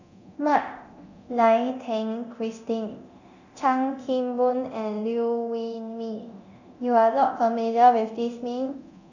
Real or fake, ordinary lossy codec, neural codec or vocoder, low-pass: fake; none; codec, 24 kHz, 0.9 kbps, DualCodec; 7.2 kHz